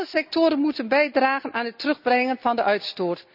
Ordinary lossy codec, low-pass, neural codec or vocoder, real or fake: none; 5.4 kHz; none; real